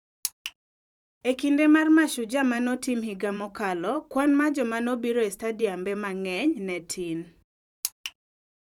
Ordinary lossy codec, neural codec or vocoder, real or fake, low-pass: none; vocoder, 44.1 kHz, 128 mel bands every 512 samples, BigVGAN v2; fake; 19.8 kHz